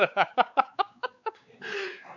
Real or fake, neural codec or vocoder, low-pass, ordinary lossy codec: fake; codec, 16 kHz, 4 kbps, X-Codec, WavLM features, trained on Multilingual LibriSpeech; 7.2 kHz; none